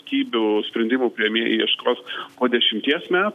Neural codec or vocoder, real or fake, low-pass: none; real; 14.4 kHz